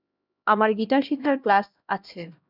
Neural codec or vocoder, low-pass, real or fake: codec, 16 kHz, 1 kbps, X-Codec, HuBERT features, trained on LibriSpeech; 5.4 kHz; fake